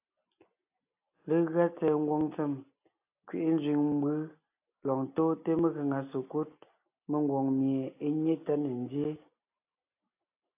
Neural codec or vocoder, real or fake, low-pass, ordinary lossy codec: none; real; 3.6 kHz; AAC, 32 kbps